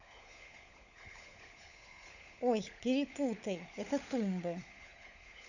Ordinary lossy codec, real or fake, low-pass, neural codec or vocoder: none; fake; 7.2 kHz; codec, 16 kHz, 16 kbps, FunCodec, trained on LibriTTS, 50 frames a second